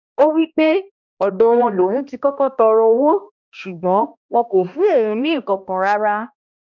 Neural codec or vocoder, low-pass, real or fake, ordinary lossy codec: codec, 16 kHz, 1 kbps, X-Codec, HuBERT features, trained on balanced general audio; 7.2 kHz; fake; none